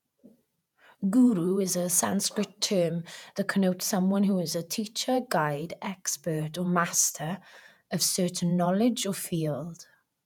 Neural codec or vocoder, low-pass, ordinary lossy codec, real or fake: vocoder, 48 kHz, 128 mel bands, Vocos; 19.8 kHz; none; fake